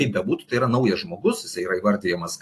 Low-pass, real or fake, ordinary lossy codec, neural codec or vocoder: 14.4 kHz; real; AAC, 48 kbps; none